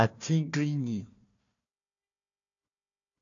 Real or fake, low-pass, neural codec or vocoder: fake; 7.2 kHz; codec, 16 kHz, 1 kbps, FunCodec, trained on Chinese and English, 50 frames a second